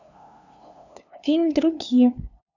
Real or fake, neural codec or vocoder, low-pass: fake; codec, 16 kHz, 2 kbps, FunCodec, trained on LibriTTS, 25 frames a second; 7.2 kHz